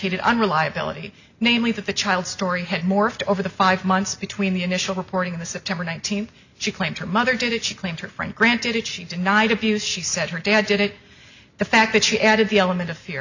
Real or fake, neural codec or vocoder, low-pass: real; none; 7.2 kHz